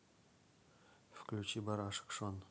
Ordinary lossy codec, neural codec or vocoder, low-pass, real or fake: none; none; none; real